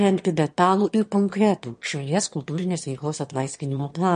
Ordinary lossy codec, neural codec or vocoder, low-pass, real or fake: MP3, 48 kbps; autoencoder, 22.05 kHz, a latent of 192 numbers a frame, VITS, trained on one speaker; 9.9 kHz; fake